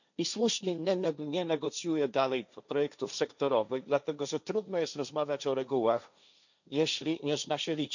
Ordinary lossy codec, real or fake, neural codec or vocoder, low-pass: none; fake; codec, 16 kHz, 1.1 kbps, Voila-Tokenizer; 7.2 kHz